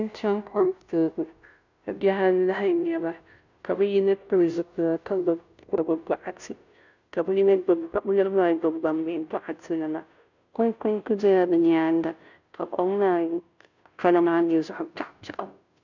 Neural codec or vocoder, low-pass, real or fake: codec, 16 kHz, 0.5 kbps, FunCodec, trained on Chinese and English, 25 frames a second; 7.2 kHz; fake